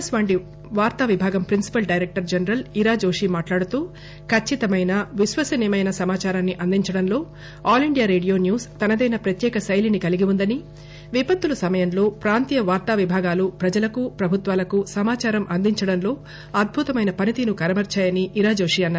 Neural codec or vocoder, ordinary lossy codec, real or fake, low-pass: none; none; real; none